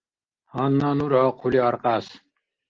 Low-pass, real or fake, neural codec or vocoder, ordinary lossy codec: 7.2 kHz; real; none; Opus, 16 kbps